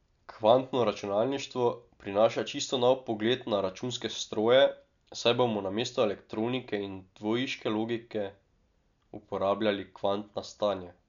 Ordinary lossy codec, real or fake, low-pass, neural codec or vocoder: none; real; 7.2 kHz; none